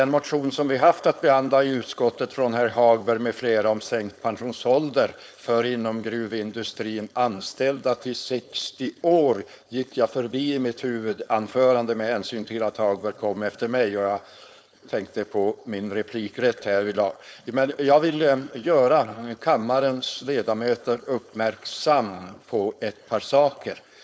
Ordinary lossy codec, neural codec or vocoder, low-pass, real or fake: none; codec, 16 kHz, 4.8 kbps, FACodec; none; fake